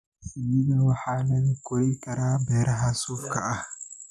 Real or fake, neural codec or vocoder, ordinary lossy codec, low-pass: real; none; none; none